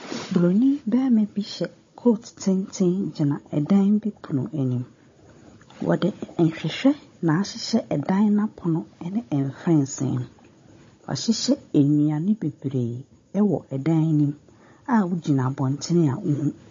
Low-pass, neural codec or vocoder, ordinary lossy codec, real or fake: 7.2 kHz; codec, 16 kHz, 16 kbps, FunCodec, trained on Chinese and English, 50 frames a second; MP3, 32 kbps; fake